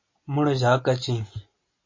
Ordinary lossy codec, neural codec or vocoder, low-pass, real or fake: MP3, 32 kbps; none; 7.2 kHz; real